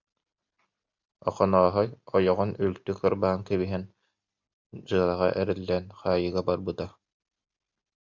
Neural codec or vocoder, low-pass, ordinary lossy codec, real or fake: none; 7.2 kHz; MP3, 64 kbps; real